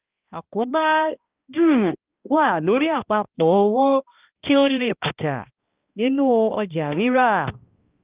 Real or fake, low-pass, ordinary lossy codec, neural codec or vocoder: fake; 3.6 kHz; Opus, 32 kbps; codec, 16 kHz, 1 kbps, X-Codec, HuBERT features, trained on balanced general audio